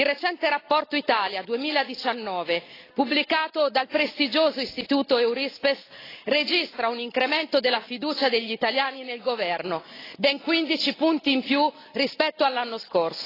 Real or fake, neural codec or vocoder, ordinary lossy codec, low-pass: real; none; AAC, 24 kbps; 5.4 kHz